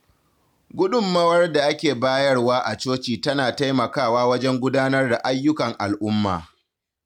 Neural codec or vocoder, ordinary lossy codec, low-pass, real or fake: none; none; 19.8 kHz; real